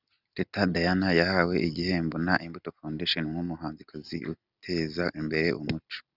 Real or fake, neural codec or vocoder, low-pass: real; none; 5.4 kHz